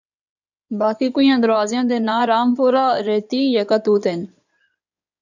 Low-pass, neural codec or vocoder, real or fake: 7.2 kHz; codec, 16 kHz in and 24 kHz out, 2.2 kbps, FireRedTTS-2 codec; fake